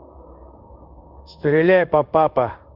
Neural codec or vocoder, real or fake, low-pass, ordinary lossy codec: codec, 16 kHz in and 24 kHz out, 1 kbps, XY-Tokenizer; fake; 5.4 kHz; Opus, 32 kbps